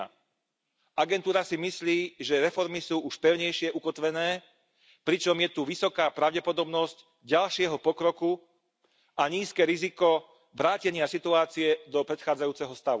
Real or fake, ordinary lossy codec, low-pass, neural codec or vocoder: real; none; none; none